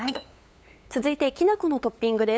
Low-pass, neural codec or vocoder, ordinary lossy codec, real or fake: none; codec, 16 kHz, 8 kbps, FunCodec, trained on LibriTTS, 25 frames a second; none; fake